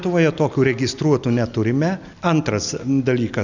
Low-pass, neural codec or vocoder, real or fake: 7.2 kHz; none; real